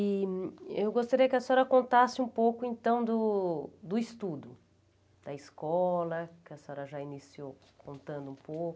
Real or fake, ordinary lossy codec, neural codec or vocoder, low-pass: real; none; none; none